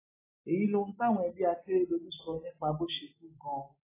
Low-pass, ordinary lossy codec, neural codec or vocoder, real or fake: 3.6 kHz; AAC, 16 kbps; none; real